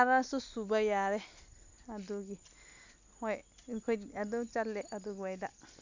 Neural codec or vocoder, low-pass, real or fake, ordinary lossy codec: none; 7.2 kHz; real; AAC, 48 kbps